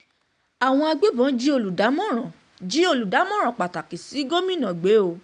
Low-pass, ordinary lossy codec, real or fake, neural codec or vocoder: 9.9 kHz; none; real; none